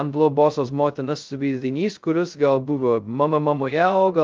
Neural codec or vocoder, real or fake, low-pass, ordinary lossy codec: codec, 16 kHz, 0.2 kbps, FocalCodec; fake; 7.2 kHz; Opus, 24 kbps